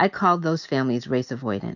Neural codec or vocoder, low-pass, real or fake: none; 7.2 kHz; real